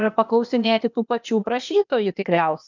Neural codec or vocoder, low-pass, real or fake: codec, 16 kHz, 0.8 kbps, ZipCodec; 7.2 kHz; fake